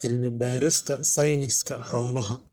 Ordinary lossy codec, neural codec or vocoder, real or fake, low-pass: none; codec, 44.1 kHz, 1.7 kbps, Pupu-Codec; fake; none